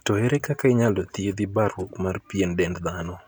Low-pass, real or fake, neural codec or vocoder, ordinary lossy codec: none; real; none; none